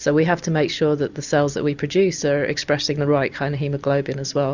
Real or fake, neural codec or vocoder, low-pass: real; none; 7.2 kHz